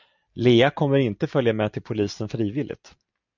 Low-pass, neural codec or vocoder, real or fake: 7.2 kHz; none; real